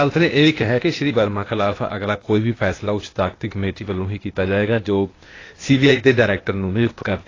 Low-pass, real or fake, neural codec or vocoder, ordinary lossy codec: 7.2 kHz; fake; codec, 16 kHz, 0.8 kbps, ZipCodec; AAC, 32 kbps